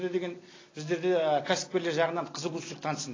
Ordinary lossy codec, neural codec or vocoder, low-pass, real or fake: AAC, 32 kbps; none; 7.2 kHz; real